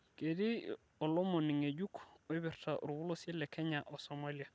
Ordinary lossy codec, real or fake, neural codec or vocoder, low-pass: none; real; none; none